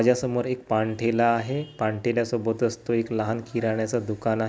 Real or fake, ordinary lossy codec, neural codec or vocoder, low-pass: real; none; none; none